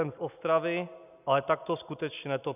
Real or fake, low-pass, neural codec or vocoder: real; 3.6 kHz; none